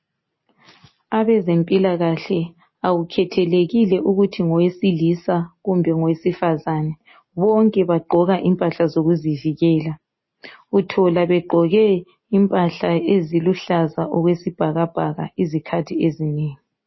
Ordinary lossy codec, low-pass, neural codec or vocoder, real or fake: MP3, 24 kbps; 7.2 kHz; none; real